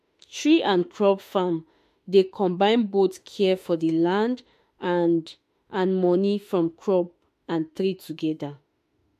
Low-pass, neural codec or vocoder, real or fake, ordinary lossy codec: 14.4 kHz; autoencoder, 48 kHz, 32 numbers a frame, DAC-VAE, trained on Japanese speech; fake; MP3, 64 kbps